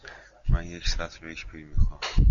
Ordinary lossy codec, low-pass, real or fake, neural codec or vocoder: MP3, 64 kbps; 7.2 kHz; real; none